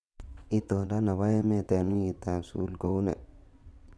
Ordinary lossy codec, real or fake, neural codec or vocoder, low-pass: none; fake; vocoder, 22.05 kHz, 80 mel bands, WaveNeXt; none